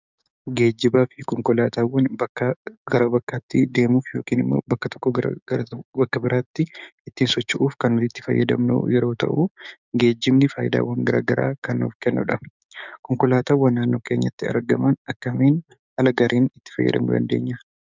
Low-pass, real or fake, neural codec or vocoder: 7.2 kHz; fake; codec, 16 kHz, 6 kbps, DAC